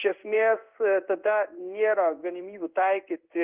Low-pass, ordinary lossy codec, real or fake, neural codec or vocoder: 3.6 kHz; Opus, 24 kbps; fake; codec, 16 kHz in and 24 kHz out, 1 kbps, XY-Tokenizer